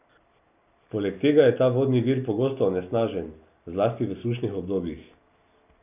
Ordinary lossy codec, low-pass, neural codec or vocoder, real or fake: none; 3.6 kHz; none; real